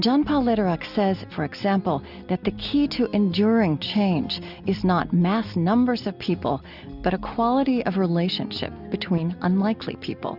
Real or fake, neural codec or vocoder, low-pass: real; none; 5.4 kHz